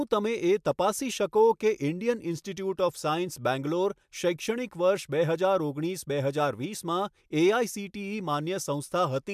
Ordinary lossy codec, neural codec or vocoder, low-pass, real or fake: MP3, 96 kbps; none; 14.4 kHz; real